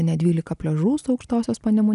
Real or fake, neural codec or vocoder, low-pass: real; none; 10.8 kHz